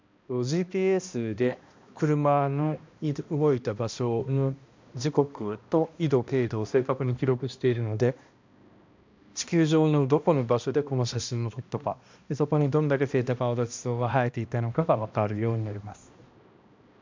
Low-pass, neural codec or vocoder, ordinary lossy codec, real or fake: 7.2 kHz; codec, 16 kHz, 1 kbps, X-Codec, HuBERT features, trained on balanced general audio; MP3, 64 kbps; fake